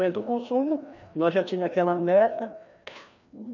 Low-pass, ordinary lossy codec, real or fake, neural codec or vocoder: 7.2 kHz; none; fake; codec, 16 kHz, 1 kbps, FreqCodec, larger model